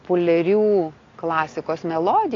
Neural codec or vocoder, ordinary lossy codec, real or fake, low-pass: none; AAC, 32 kbps; real; 7.2 kHz